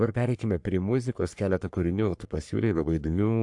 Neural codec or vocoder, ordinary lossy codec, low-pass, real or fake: codec, 44.1 kHz, 3.4 kbps, Pupu-Codec; AAC, 64 kbps; 10.8 kHz; fake